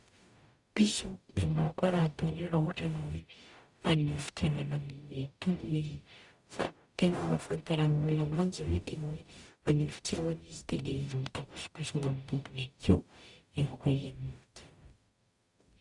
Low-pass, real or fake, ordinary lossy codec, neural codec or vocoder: 10.8 kHz; fake; Opus, 64 kbps; codec, 44.1 kHz, 0.9 kbps, DAC